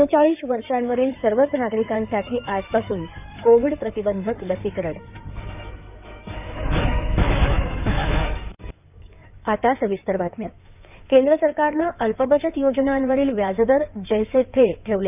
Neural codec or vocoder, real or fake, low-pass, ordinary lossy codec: codec, 16 kHz in and 24 kHz out, 2.2 kbps, FireRedTTS-2 codec; fake; 3.6 kHz; none